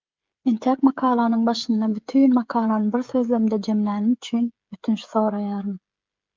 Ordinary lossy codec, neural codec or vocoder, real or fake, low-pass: Opus, 32 kbps; codec, 16 kHz, 16 kbps, FreqCodec, smaller model; fake; 7.2 kHz